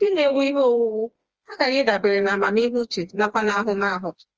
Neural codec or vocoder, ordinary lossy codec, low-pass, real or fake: codec, 16 kHz, 2 kbps, FreqCodec, smaller model; Opus, 24 kbps; 7.2 kHz; fake